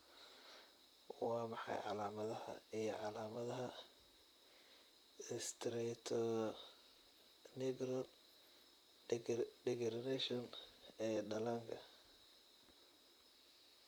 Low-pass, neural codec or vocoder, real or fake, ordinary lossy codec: none; vocoder, 44.1 kHz, 128 mel bands, Pupu-Vocoder; fake; none